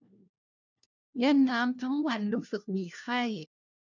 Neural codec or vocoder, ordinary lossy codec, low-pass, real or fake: codec, 16 kHz, 1 kbps, FunCodec, trained on LibriTTS, 50 frames a second; none; 7.2 kHz; fake